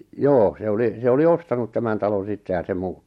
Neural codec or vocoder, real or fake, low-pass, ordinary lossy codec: none; real; 19.8 kHz; MP3, 64 kbps